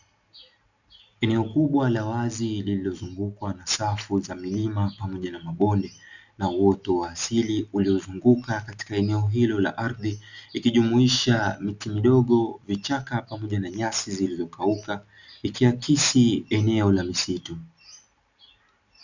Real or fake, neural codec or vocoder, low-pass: real; none; 7.2 kHz